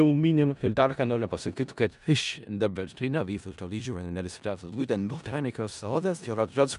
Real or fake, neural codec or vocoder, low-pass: fake; codec, 16 kHz in and 24 kHz out, 0.4 kbps, LongCat-Audio-Codec, four codebook decoder; 10.8 kHz